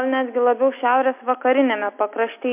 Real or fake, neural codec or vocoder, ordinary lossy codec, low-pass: real; none; MP3, 32 kbps; 3.6 kHz